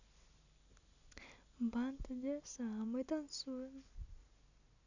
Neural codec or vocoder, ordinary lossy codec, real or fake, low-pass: none; none; real; 7.2 kHz